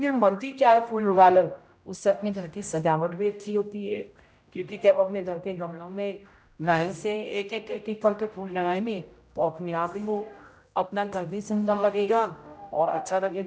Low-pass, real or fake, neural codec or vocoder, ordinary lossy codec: none; fake; codec, 16 kHz, 0.5 kbps, X-Codec, HuBERT features, trained on general audio; none